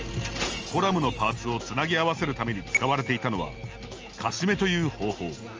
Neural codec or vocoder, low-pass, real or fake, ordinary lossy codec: none; 7.2 kHz; real; Opus, 24 kbps